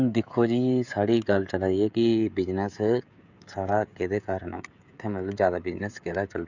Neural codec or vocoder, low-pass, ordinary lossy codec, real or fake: codec, 16 kHz, 16 kbps, FreqCodec, smaller model; 7.2 kHz; none; fake